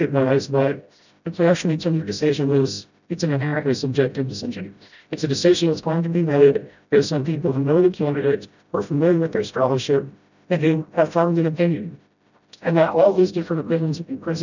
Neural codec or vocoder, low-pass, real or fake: codec, 16 kHz, 0.5 kbps, FreqCodec, smaller model; 7.2 kHz; fake